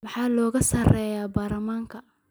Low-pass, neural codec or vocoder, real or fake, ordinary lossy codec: none; none; real; none